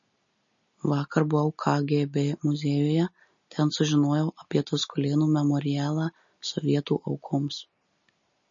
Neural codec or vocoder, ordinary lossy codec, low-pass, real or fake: none; MP3, 32 kbps; 7.2 kHz; real